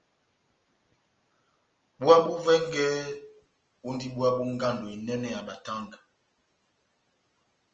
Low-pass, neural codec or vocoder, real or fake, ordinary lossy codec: 7.2 kHz; none; real; Opus, 24 kbps